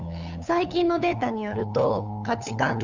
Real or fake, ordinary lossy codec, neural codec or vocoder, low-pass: fake; none; codec, 16 kHz, 8 kbps, FunCodec, trained on LibriTTS, 25 frames a second; 7.2 kHz